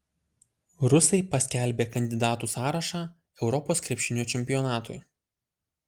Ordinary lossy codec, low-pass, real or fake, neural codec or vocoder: Opus, 32 kbps; 19.8 kHz; real; none